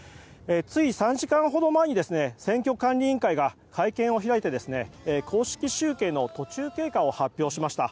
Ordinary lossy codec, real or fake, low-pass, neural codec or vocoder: none; real; none; none